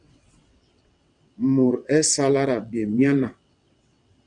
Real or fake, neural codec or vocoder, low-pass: fake; vocoder, 22.05 kHz, 80 mel bands, WaveNeXt; 9.9 kHz